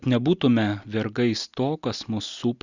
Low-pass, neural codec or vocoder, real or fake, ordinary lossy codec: 7.2 kHz; none; real; Opus, 64 kbps